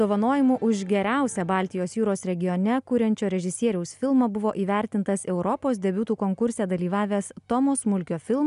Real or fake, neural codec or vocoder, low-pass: real; none; 10.8 kHz